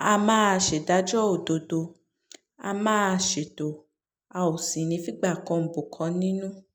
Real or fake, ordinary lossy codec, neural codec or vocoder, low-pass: real; none; none; none